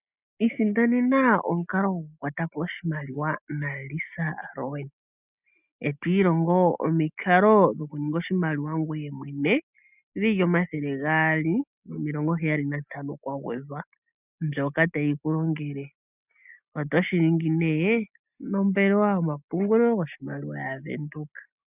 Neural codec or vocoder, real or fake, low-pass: none; real; 3.6 kHz